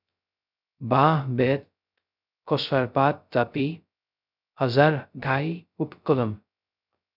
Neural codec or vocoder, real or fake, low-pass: codec, 16 kHz, 0.2 kbps, FocalCodec; fake; 5.4 kHz